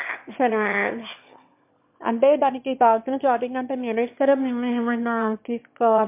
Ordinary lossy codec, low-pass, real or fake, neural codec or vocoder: MP3, 32 kbps; 3.6 kHz; fake; autoencoder, 22.05 kHz, a latent of 192 numbers a frame, VITS, trained on one speaker